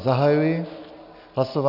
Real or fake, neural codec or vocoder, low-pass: real; none; 5.4 kHz